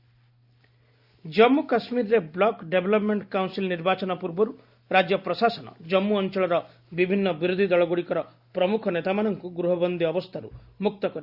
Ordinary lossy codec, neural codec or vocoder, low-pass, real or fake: Opus, 64 kbps; none; 5.4 kHz; real